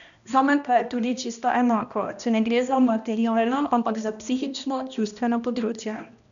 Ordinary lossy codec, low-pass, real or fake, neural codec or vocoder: none; 7.2 kHz; fake; codec, 16 kHz, 1 kbps, X-Codec, HuBERT features, trained on balanced general audio